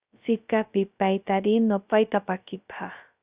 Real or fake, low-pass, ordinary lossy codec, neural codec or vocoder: fake; 3.6 kHz; Opus, 64 kbps; codec, 16 kHz, 0.2 kbps, FocalCodec